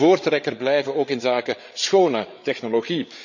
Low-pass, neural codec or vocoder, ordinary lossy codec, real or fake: 7.2 kHz; codec, 16 kHz, 8 kbps, FreqCodec, larger model; none; fake